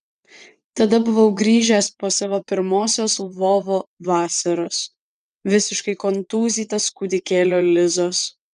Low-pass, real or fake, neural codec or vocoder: 9.9 kHz; fake; vocoder, 22.05 kHz, 80 mel bands, WaveNeXt